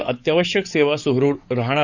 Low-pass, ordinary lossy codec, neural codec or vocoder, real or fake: 7.2 kHz; none; codec, 44.1 kHz, 7.8 kbps, DAC; fake